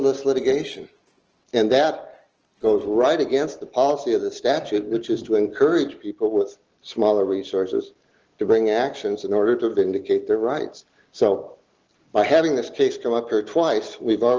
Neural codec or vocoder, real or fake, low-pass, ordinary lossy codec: none; real; 7.2 kHz; Opus, 16 kbps